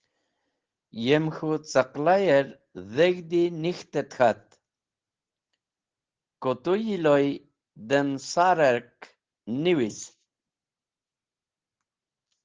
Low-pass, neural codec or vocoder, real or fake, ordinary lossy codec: 7.2 kHz; none; real; Opus, 16 kbps